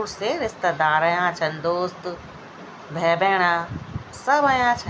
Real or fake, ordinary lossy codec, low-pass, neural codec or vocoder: real; none; none; none